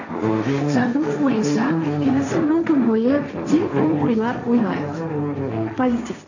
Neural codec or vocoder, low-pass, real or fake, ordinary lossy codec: codec, 16 kHz, 1.1 kbps, Voila-Tokenizer; 7.2 kHz; fake; none